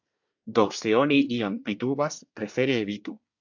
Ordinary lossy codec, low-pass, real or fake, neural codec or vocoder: AAC, 48 kbps; 7.2 kHz; fake; codec, 24 kHz, 1 kbps, SNAC